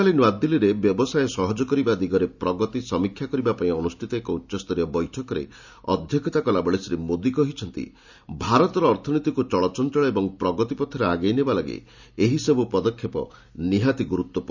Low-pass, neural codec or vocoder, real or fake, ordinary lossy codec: 7.2 kHz; none; real; none